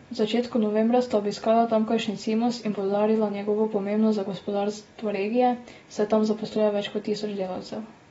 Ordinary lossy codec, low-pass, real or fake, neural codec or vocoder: AAC, 24 kbps; 19.8 kHz; real; none